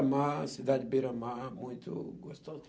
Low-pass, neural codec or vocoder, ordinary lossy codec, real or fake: none; none; none; real